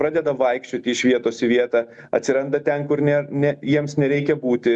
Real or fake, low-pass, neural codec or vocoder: real; 10.8 kHz; none